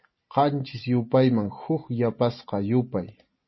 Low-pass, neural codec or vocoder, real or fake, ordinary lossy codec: 7.2 kHz; none; real; MP3, 24 kbps